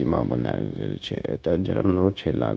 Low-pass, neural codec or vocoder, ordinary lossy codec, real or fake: none; codec, 16 kHz, 0.9 kbps, LongCat-Audio-Codec; none; fake